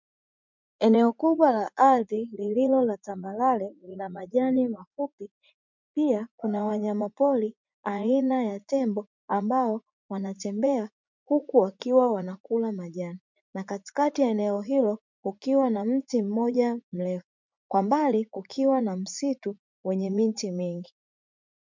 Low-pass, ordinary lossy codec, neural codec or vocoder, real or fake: 7.2 kHz; MP3, 64 kbps; vocoder, 44.1 kHz, 80 mel bands, Vocos; fake